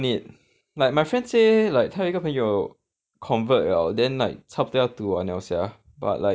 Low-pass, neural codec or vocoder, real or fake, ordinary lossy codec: none; none; real; none